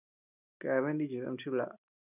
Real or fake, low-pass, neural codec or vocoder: real; 3.6 kHz; none